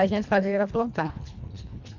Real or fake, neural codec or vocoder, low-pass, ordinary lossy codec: fake; codec, 24 kHz, 1.5 kbps, HILCodec; 7.2 kHz; none